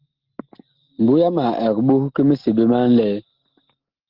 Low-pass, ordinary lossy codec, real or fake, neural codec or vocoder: 5.4 kHz; Opus, 16 kbps; real; none